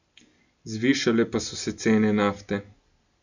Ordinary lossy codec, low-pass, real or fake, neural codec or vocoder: none; 7.2 kHz; real; none